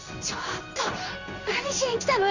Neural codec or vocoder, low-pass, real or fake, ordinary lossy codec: codec, 16 kHz in and 24 kHz out, 1 kbps, XY-Tokenizer; 7.2 kHz; fake; none